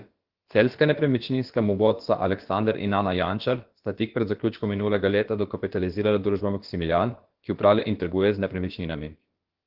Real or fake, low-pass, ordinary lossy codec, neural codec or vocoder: fake; 5.4 kHz; Opus, 16 kbps; codec, 16 kHz, about 1 kbps, DyCAST, with the encoder's durations